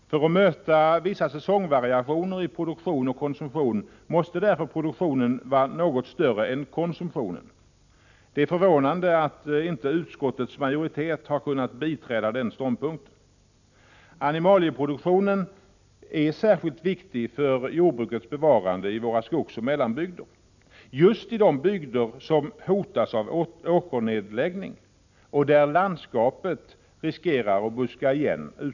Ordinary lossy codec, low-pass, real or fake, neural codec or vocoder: none; 7.2 kHz; real; none